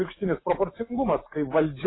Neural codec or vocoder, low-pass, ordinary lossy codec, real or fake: none; 7.2 kHz; AAC, 16 kbps; real